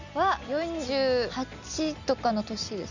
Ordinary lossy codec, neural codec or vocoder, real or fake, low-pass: none; none; real; 7.2 kHz